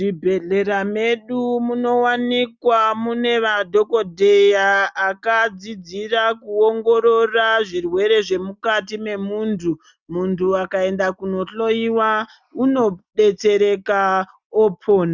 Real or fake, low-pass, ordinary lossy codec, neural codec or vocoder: real; 7.2 kHz; Opus, 64 kbps; none